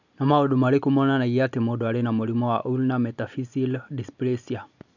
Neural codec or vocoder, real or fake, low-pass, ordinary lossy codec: none; real; 7.2 kHz; none